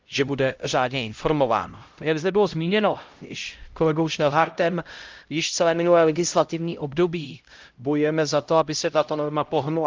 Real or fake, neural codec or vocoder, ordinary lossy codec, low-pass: fake; codec, 16 kHz, 0.5 kbps, X-Codec, HuBERT features, trained on LibriSpeech; Opus, 32 kbps; 7.2 kHz